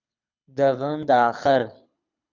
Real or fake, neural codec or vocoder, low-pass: fake; codec, 24 kHz, 6 kbps, HILCodec; 7.2 kHz